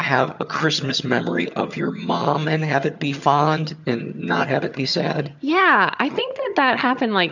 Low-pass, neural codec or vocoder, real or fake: 7.2 kHz; vocoder, 22.05 kHz, 80 mel bands, HiFi-GAN; fake